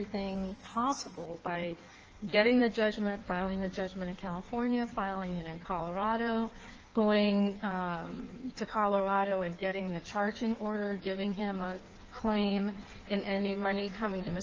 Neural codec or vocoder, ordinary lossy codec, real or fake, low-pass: codec, 16 kHz in and 24 kHz out, 1.1 kbps, FireRedTTS-2 codec; Opus, 24 kbps; fake; 7.2 kHz